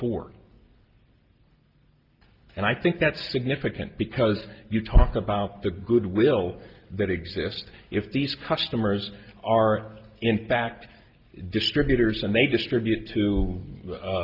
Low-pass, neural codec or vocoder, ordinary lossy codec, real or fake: 5.4 kHz; none; Opus, 32 kbps; real